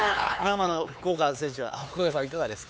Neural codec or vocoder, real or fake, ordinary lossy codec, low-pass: codec, 16 kHz, 4 kbps, X-Codec, HuBERT features, trained on LibriSpeech; fake; none; none